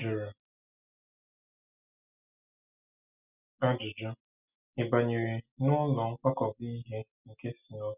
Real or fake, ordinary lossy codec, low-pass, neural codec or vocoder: real; none; 3.6 kHz; none